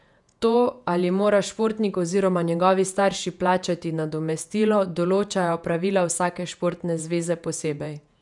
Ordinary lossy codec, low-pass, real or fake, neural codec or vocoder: none; 10.8 kHz; fake; vocoder, 48 kHz, 128 mel bands, Vocos